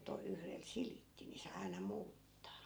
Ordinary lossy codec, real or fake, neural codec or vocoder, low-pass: none; fake; vocoder, 44.1 kHz, 128 mel bands every 512 samples, BigVGAN v2; none